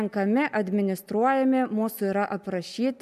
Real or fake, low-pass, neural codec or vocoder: real; 14.4 kHz; none